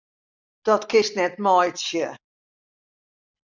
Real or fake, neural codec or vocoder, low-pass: real; none; 7.2 kHz